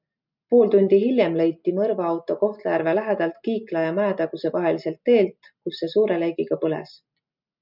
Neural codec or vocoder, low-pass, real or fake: none; 5.4 kHz; real